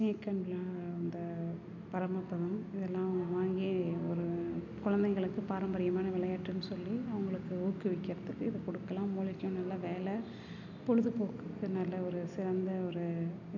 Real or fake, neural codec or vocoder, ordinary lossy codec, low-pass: real; none; none; 7.2 kHz